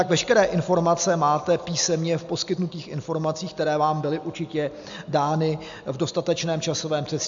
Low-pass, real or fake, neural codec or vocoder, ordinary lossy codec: 7.2 kHz; real; none; MP3, 64 kbps